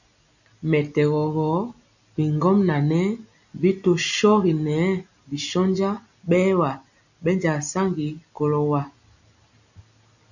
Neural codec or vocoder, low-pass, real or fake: none; 7.2 kHz; real